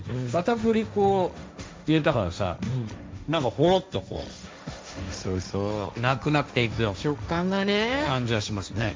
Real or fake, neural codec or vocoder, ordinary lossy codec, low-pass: fake; codec, 16 kHz, 1.1 kbps, Voila-Tokenizer; none; none